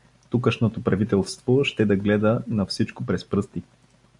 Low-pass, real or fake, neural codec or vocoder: 10.8 kHz; real; none